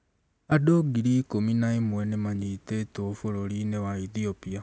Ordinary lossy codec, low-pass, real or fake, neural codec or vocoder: none; none; real; none